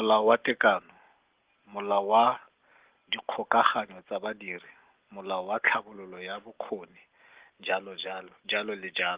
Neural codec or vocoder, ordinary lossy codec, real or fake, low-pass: none; Opus, 16 kbps; real; 3.6 kHz